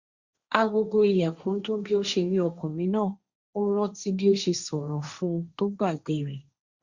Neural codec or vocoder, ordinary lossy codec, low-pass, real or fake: codec, 16 kHz, 1.1 kbps, Voila-Tokenizer; Opus, 64 kbps; 7.2 kHz; fake